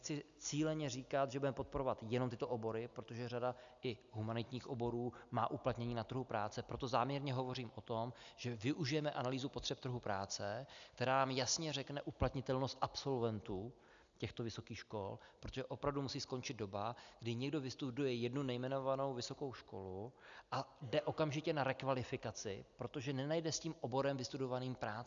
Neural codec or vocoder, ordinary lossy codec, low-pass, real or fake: none; MP3, 96 kbps; 7.2 kHz; real